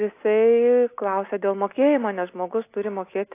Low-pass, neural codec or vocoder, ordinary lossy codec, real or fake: 3.6 kHz; none; AAC, 24 kbps; real